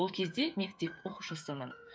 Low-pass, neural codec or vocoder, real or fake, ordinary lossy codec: none; codec, 16 kHz, 8 kbps, FreqCodec, smaller model; fake; none